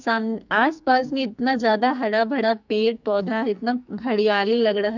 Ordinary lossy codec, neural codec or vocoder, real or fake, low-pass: none; codec, 44.1 kHz, 2.6 kbps, SNAC; fake; 7.2 kHz